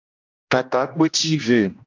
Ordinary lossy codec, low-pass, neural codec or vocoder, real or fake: AAC, 48 kbps; 7.2 kHz; codec, 16 kHz, 1 kbps, X-Codec, HuBERT features, trained on general audio; fake